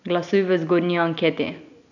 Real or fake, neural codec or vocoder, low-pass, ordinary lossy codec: real; none; 7.2 kHz; none